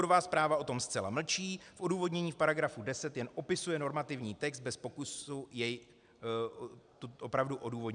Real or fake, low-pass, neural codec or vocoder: real; 9.9 kHz; none